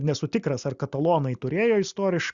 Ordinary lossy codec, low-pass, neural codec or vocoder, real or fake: Opus, 64 kbps; 7.2 kHz; none; real